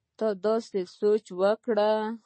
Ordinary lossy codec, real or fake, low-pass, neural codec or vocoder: MP3, 32 kbps; real; 9.9 kHz; none